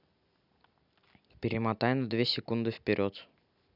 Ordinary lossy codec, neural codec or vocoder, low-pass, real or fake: none; none; 5.4 kHz; real